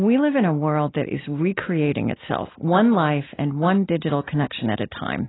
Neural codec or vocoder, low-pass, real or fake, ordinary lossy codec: none; 7.2 kHz; real; AAC, 16 kbps